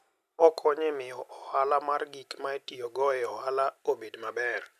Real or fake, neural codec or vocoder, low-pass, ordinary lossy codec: real; none; 14.4 kHz; none